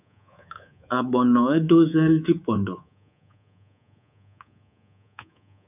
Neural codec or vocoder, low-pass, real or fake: codec, 24 kHz, 3.1 kbps, DualCodec; 3.6 kHz; fake